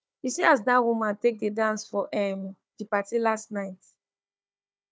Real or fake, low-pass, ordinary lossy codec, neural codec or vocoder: fake; none; none; codec, 16 kHz, 4 kbps, FunCodec, trained on Chinese and English, 50 frames a second